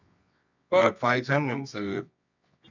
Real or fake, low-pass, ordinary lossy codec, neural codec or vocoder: fake; 7.2 kHz; none; codec, 24 kHz, 0.9 kbps, WavTokenizer, medium music audio release